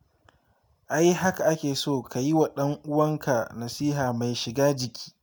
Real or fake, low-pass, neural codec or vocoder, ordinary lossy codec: real; none; none; none